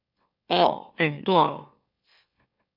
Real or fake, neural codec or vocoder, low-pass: fake; autoencoder, 44.1 kHz, a latent of 192 numbers a frame, MeloTTS; 5.4 kHz